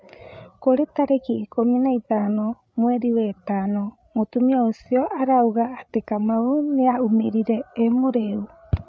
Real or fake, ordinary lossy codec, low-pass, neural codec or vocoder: fake; none; none; codec, 16 kHz, 8 kbps, FreqCodec, larger model